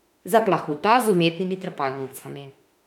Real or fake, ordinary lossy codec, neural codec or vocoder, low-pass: fake; none; autoencoder, 48 kHz, 32 numbers a frame, DAC-VAE, trained on Japanese speech; 19.8 kHz